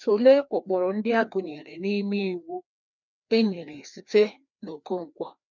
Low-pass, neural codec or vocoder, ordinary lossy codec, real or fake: 7.2 kHz; codec, 16 kHz, 2 kbps, FreqCodec, larger model; none; fake